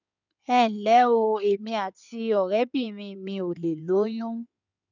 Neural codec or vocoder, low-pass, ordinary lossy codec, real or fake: codec, 16 kHz in and 24 kHz out, 2.2 kbps, FireRedTTS-2 codec; 7.2 kHz; none; fake